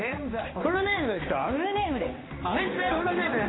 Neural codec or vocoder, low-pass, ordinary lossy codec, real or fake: codec, 16 kHz in and 24 kHz out, 1 kbps, XY-Tokenizer; 7.2 kHz; AAC, 16 kbps; fake